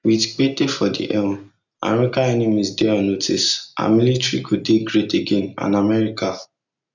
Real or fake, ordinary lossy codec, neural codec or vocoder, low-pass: real; none; none; 7.2 kHz